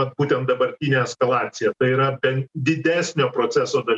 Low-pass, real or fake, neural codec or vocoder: 10.8 kHz; real; none